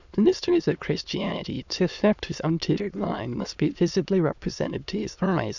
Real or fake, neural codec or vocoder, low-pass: fake; autoencoder, 22.05 kHz, a latent of 192 numbers a frame, VITS, trained on many speakers; 7.2 kHz